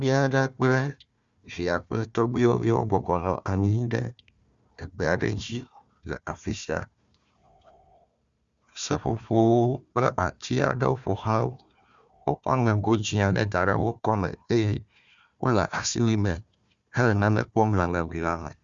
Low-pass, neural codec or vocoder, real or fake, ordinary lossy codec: 7.2 kHz; codec, 16 kHz, 1 kbps, FunCodec, trained on Chinese and English, 50 frames a second; fake; Opus, 64 kbps